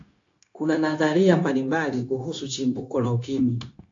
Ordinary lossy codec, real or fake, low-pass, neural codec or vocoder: AAC, 48 kbps; fake; 7.2 kHz; codec, 16 kHz, 0.9 kbps, LongCat-Audio-Codec